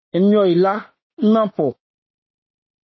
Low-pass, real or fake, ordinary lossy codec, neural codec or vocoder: 7.2 kHz; fake; MP3, 24 kbps; autoencoder, 48 kHz, 32 numbers a frame, DAC-VAE, trained on Japanese speech